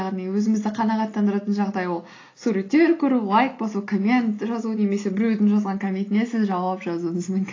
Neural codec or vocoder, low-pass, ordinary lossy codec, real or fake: none; 7.2 kHz; AAC, 32 kbps; real